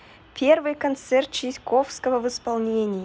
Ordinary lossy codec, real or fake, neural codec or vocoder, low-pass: none; real; none; none